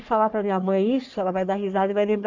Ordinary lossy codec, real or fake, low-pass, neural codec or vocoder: MP3, 64 kbps; fake; 7.2 kHz; codec, 44.1 kHz, 3.4 kbps, Pupu-Codec